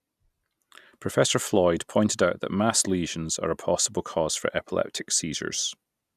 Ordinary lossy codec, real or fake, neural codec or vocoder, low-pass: none; real; none; 14.4 kHz